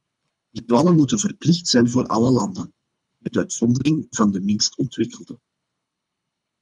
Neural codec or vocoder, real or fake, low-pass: codec, 24 kHz, 3 kbps, HILCodec; fake; 10.8 kHz